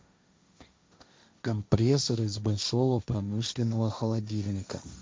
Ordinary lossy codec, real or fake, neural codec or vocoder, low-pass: none; fake; codec, 16 kHz, 1.1 kbps, Voila-Tokenizer; none